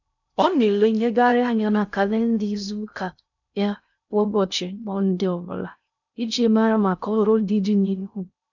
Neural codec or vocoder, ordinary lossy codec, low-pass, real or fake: codec, 16 kHz in and 24 kHz out, 0.6 kbps, FocalCodec, streaming, 2048 codes; none; 7.2 kHz; fake